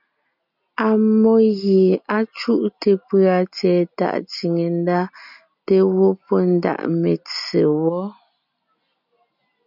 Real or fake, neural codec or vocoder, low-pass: real; none; 5.4 kHz